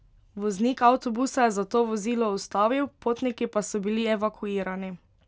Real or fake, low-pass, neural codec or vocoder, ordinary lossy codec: real; none; none; none